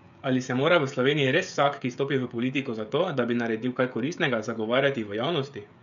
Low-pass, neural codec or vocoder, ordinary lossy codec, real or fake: 7.2 kHz; codec, 16 kHz, 16 kbps, FreqCodec, smaller model; none; fake